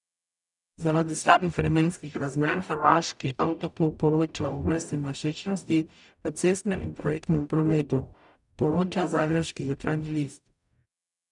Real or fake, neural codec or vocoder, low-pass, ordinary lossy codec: fake; codec, 44.1 kHz, 0.9 kbps, DAC; 10.8 kHz; none